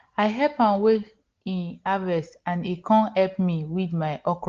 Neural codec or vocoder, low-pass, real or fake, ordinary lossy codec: none; 7.2 kHz; real; Opus, 16 kbps